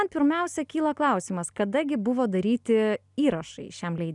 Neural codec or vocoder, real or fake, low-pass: none; real; 10.8 kHz